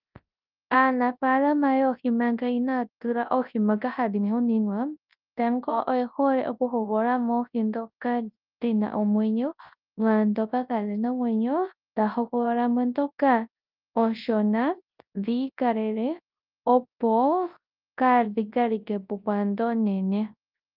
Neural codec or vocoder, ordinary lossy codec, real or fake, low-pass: codec, 24 kHz, 0.9 kbps, WavTokenizer, large speech release; Opus, 24 kbps; fake; 5.4 kHz